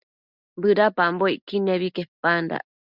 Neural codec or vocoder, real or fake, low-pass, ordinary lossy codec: none; real; 5.4 kHz; Opus, 64 kbps